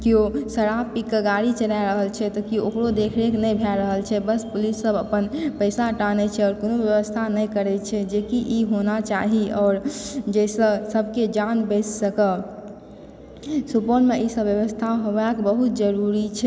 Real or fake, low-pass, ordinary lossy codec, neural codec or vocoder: real; none; none; none